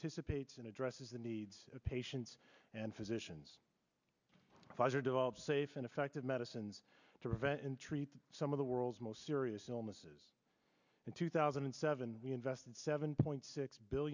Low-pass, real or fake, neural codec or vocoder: 7.2 kHz; real; none